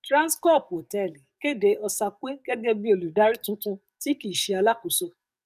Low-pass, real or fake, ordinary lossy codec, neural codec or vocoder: 14.4 kHz; fake; none; codec, 44.1 kHz, 7.8 kbps, DAC